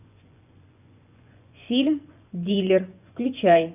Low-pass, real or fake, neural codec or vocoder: 3.6 kHz; fake; vocoder, 24 kHz, 100 mel bands, Vocos